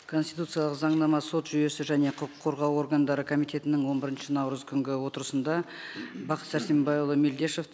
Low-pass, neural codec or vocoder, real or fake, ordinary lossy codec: none; none; real; none